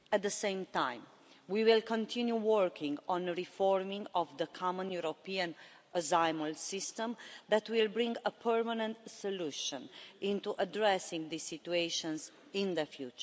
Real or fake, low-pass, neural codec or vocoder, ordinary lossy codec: real; none; none; none